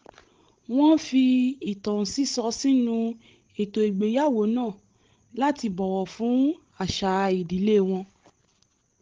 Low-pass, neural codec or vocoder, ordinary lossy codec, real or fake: 7.2 kHz; codec, 16 kHz, 16 kbps, FreqCodec, larger model; Opus, 16 kbps; fake